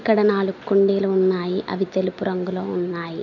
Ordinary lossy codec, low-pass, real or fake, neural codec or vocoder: none; 7.2 kHz; real; none